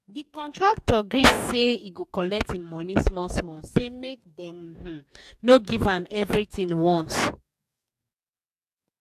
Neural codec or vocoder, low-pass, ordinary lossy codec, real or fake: codec, 44.1 kHz, 2.6 kbps, DAC; 14.4 kHz; AAC, 96 kbps; fake